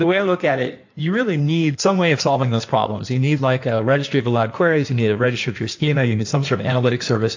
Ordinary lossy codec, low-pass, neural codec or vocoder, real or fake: AAC, 48 kbps; 7.2 kHz; codec, 16 kHz in and 24 kHz out, 1.1 kbps, FireRedTTS-2 codec; fake